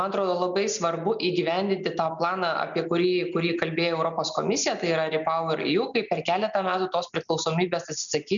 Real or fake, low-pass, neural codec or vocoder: real; 7.2 kHz; none